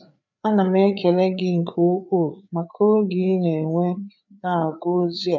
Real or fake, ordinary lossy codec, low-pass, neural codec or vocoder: fake; none; 7.2 kHz; codec, 16 kHz, 4 kbps, FreqCodec, larger model